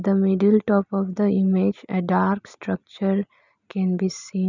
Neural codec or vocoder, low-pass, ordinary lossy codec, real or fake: none; 7.2 kHz; none; real